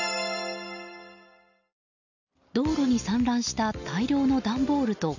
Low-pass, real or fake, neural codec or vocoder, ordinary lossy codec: 7.2 kHz; real; none; none